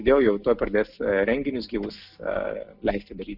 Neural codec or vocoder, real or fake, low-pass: none; real; 5.4 kHz